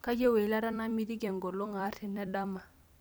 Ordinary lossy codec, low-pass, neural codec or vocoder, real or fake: none; none; vocoder, 44.1 kHz, 128 mel bands every 256 samples, BigVGAN v2; fake